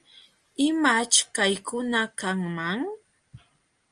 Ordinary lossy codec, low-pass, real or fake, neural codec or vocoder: Opus, 32 kbps; 9.9 kHz; real; none